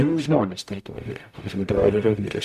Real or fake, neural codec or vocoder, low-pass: fake; codec, 44.1 kHz, 0.9 kbps, DAC; 14.4 kHz